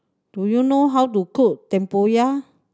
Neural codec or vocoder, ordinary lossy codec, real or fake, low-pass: none; none; real; none